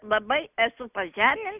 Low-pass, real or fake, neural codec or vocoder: 3.6 kHz; real; none